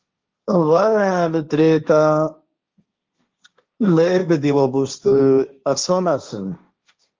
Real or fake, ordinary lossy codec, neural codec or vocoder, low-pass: fake; Opus, 32 kbps; codec, 16 kHz, 1.1 kbps, Voila-Tokenizer; 7.2 kHz